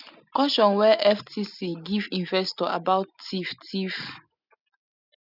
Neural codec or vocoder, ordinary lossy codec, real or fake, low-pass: none; none; real; 5.4 kHz